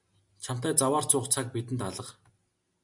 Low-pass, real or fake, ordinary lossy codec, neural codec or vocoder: 10.8 kHz; real; MP3, 96 kbps; none